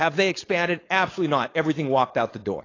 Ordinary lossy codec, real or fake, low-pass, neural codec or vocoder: AAC, 32 kbps; fake; 7.2 kHz; codec, 16 kHz, 4.8 kbps, FACodec